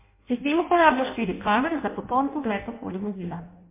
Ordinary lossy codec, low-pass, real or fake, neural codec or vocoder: MP3, 24 kbps; 3.6 kHz; fake; codec, 16 kHz in and 24 kHz out, 0.6 kbps, FireRedTTS-2 codec